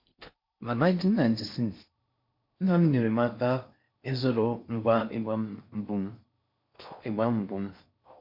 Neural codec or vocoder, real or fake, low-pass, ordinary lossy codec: codec, 16 kHz in and 24 kHz out, 0.6 kbps, FocalCodec, streaming, 4096 codes; fake; 5.4 kHz; MP3, 48 kbps